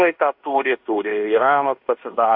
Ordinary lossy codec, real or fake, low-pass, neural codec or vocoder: AAC, 48 kbps; fake; 5.4 kHz; codec, 16 kHz in and 24 kHz out, 0.9 kbps, LongCat-Audio-Codec, fine tuned four codebook decoder